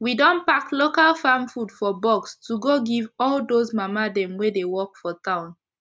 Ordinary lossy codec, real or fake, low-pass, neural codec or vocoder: none; real; none; none